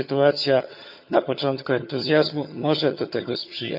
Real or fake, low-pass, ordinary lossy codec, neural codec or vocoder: fake; 5.4 kHz; none; vocoder, 22.05 kHz, 80 mel bands, HiFi-GAN